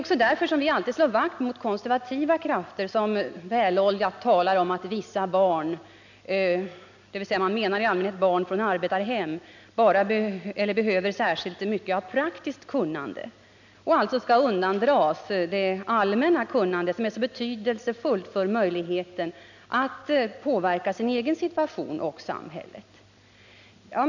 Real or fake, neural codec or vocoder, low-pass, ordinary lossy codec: real; none; 7.2 kHz; none